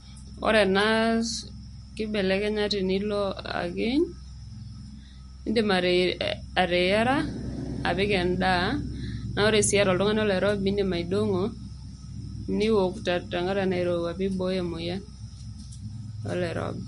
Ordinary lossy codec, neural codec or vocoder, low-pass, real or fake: MP3, 48 kbps; none; 10.8 kHz; real